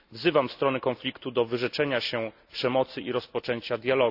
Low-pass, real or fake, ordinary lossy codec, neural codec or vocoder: 5.4 kHz; real; none; none